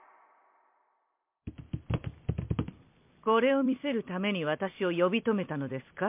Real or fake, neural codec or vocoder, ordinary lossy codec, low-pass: fake; vocoder, 44.1 kHz, 128 mel bands every 256 samples, BigVGAN v2; MP3, 32 kbps; 3.6 kHz